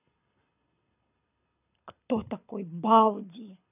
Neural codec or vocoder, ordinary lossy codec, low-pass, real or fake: codec, 24 kHz, 6 kbps, HILCodec; none; 3.6 kHz; fake